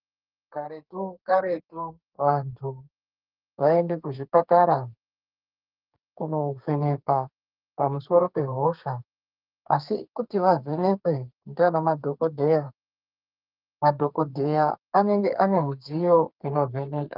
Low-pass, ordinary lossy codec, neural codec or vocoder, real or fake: 5.4 kHz; Opus, 24 kbps; codec, 32 kHz, 1.9 kbps, SNAC; fake